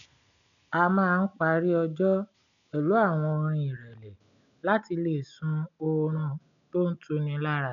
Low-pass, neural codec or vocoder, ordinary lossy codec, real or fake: 7.2 kHz; none; none; real